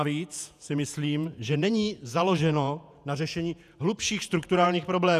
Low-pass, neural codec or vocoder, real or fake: 14.4 kHz; vocoder, 48 kHz, 128 mel bands, Vocos; fake